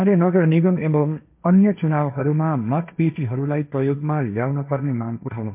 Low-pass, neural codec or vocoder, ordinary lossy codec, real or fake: 3.6 kHz; codec, 16 kHz, 1.1 kbps, Voila-Tokenizer; MP3, 32 kbps; fake